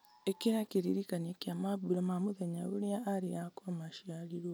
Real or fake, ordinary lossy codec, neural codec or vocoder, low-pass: real; none; none; none